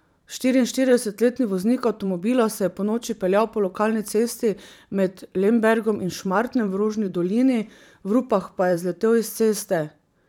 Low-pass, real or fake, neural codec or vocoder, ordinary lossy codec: 19.8 kHz; fake; vocoder, 44.1 kHz, 128 mel bands every 512 samples, BigVGAN v2; none